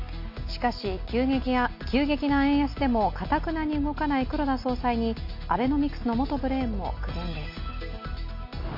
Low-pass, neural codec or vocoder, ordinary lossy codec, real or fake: 5.4 kHz; none; MP3, 32 kbps; real